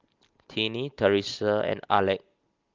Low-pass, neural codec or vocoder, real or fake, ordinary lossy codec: 7.2 kHz; none; real; Opus, 32 kbps